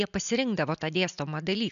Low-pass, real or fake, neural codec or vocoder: 7.2 kHz; real; none